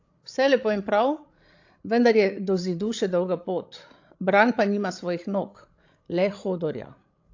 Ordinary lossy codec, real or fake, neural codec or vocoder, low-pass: AAC, 48 kbps; fake; codec, 16 kHz, 16 kbps, FreqCodec, larger model; 7.2 kHz